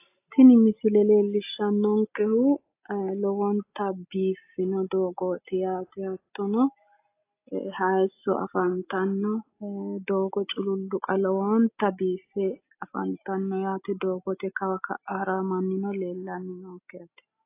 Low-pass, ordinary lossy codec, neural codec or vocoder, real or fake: 3.6 kHz; AAC, 24 kbps; none; real